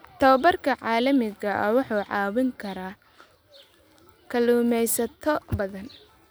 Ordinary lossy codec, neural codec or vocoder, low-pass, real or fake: none; none; none; real